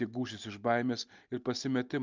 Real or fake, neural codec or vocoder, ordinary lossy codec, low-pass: real; none; Opus, 32 kbps; 7.2 kHz